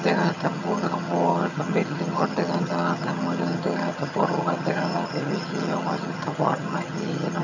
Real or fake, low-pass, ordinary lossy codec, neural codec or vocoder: fake; 7.2 kHz; none; vocoder, 22.05 kHz, 80 mel bands, HiFi-GAN